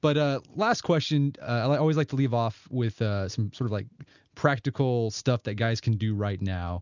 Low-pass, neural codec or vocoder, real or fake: 7.2 kHz; none; real